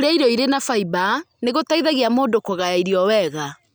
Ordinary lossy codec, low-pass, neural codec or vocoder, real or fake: none; none; none; real